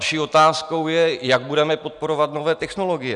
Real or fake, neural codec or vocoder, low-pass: real; none; 10.8 kHz